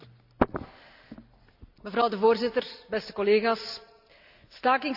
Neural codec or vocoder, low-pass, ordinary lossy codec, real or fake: none; 5.4 kHz; none; real